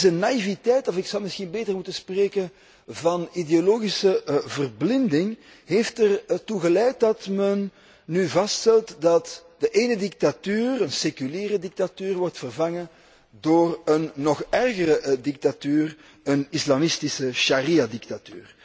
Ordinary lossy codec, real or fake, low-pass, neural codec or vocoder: none; real; none; none